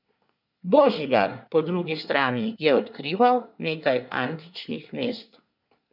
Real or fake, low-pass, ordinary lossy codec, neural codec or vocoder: fake; 5.4 kHz; none; codec, 24 kHz, 1 kbps, SNAC